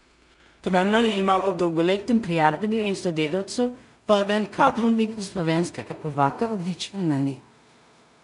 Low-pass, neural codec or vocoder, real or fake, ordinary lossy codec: 10.8 kHz; codec, 16 kHz in and 24 kHz out, 0.4 kbps, LongCat-Audio-Codec, two codebook decoder; fake; none